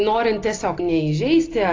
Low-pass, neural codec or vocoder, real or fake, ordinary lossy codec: 7.2 kHz; none; real; AAC, 32 kbps